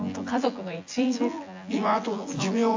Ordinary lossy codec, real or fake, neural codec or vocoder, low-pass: AAC, 48 kbps; fake; vocoder, 24 kHz, 100 mel bands, Vocos; 7.2 kHz